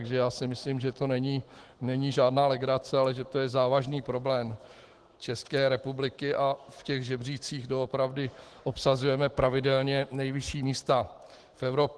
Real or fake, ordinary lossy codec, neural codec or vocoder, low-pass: fake; Opus, 16 kbps; autoencoder, 48 kHz, 128 numbers a frame, DAC-VAE, trained on Japanese speech; 10.8 kHz